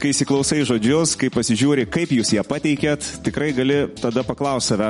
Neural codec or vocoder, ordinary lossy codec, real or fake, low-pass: none; MP3, 48 kbps; real; 10.8 kHz